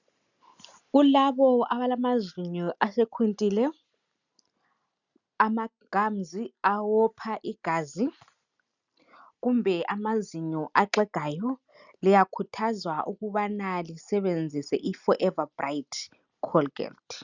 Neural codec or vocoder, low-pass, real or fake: none; 7.2 kHz; real